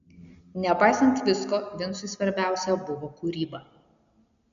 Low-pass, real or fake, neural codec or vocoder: 7.2 kHz; real; none